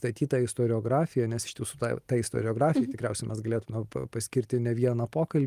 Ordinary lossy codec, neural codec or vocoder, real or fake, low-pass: Opus, 32 kbps; none; real; 14.4 kHz